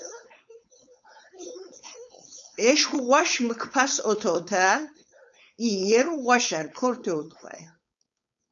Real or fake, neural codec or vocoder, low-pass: fake; codec, 16 kHz, 4.8 kbps, FACodec; 7.2 kHz